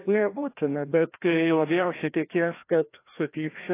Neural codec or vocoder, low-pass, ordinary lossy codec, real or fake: codec, 16 kHz, 1 kbps, FreqCodec, larger model; 3.6 kHz; AAC, 24 kbps; fake